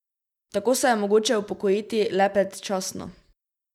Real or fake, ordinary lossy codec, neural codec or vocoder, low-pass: real; none; none; 19.8 kHz